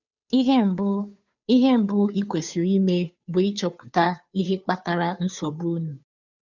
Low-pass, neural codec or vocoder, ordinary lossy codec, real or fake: 7.2 kHz; codec, 16 kHz, 2 kbps, FunCodec, trained on Chinese and English, 25 frames a second; none; fake